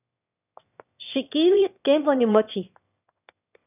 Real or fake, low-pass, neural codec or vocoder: fake; 3.6 kHz; autoencoder, 22.05 kHz, a latent of 192 numbers a frame, VITS, trained on one speaker